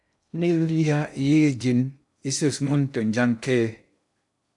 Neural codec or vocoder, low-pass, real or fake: codec, 16 kHz in and 24 kHz out, 0.6 kbps, FocalCodec, streaming, 2048 codes; 10.8 kHz; fake